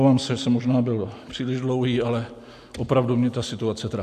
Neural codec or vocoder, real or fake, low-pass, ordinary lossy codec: none; real; 14.4 kHz; MP3, 64 kbps